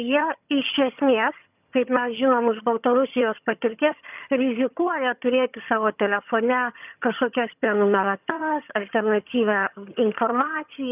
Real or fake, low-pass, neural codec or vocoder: fake; 3.6 kHz; vocoder, 22.05 kHz, 80 mel bands, HiFi-GAN